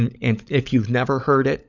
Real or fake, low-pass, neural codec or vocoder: fake; 7.2 kHz; codec, 16 kHz, 16 kbps, FunCodec, trained on LibriTTS, 50 frames a second